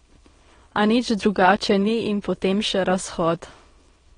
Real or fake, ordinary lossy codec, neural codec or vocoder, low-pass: fake; AAC, 32 kbps; autoencoder, 22.05 kHz, a latent of 192 numbers a frame, VITS, trained on many speakers; 9.9 kHz